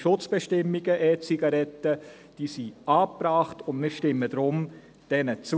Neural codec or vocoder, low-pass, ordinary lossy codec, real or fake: none; none; none; real